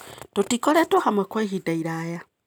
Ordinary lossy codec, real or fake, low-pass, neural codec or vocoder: none; real; none; none